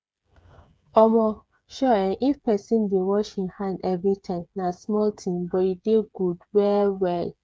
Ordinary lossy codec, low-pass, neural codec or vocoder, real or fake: none; none; codec, 16 kHz, 8 kbps, FreqCodec, smaller model; fake